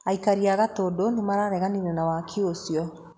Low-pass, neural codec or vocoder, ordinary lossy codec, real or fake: none; none; none; real